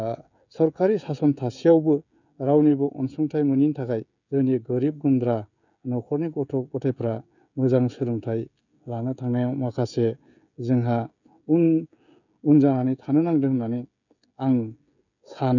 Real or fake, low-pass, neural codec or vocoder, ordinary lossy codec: fake; 7.2 kHz; codec, 16 kHz, 16 kbps, FreqCodec, smaller model; none